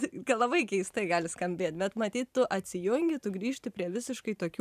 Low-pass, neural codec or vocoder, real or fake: 14.4 kHz; vocoder, 44.1 kHz, 128 mel bands every 512 samples, BigVGAN v2; fake